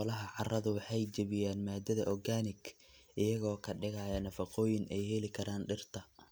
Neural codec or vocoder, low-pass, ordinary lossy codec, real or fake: none; none; none; real